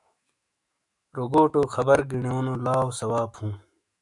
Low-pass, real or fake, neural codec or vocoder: 10.8 kHz; fake; autoencoder, 48 kHz, 128 numbers a frame, DAC-VAE, trained on Japanese speech